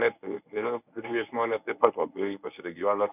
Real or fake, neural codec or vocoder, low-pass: fake; codec, 24 kHz, 0.9 kbps, WavTokenizer, medium speech release version 1; 3.6 kHz